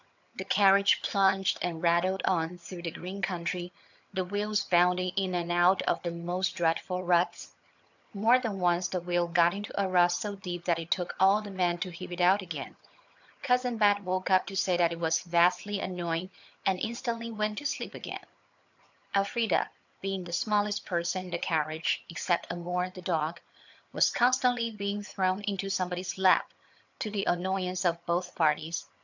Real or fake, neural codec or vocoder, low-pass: fake; vocoder, 22.05 kHz, 80 mel bands, HiFi-GAN; 7.2 kHz